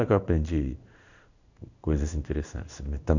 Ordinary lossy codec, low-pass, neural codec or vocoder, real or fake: none; 7.2 kHz; codec, 16 kHz in and 24 kHz out, 1 kbps, XY-Tokenizer; fake